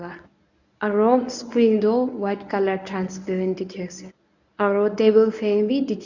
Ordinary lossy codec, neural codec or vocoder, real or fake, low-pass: none; codec, 24 kHz, 0.9 kbps, WavTokenizer, medium speech release version 1; fake; 7.2 kHz